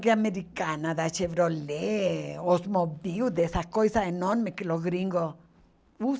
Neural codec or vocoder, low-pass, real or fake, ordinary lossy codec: none; none; real; none